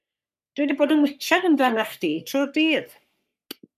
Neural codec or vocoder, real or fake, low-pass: codec, 44.1 kHz, 3.4 kbps, Pupu-Codec; fake; 14.4 kHz